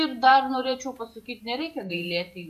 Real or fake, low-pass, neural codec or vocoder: fake; 14.4 kHz; vocoder, 44.1 kHz, 128 mel bands every 512 samples, BigVGAN v2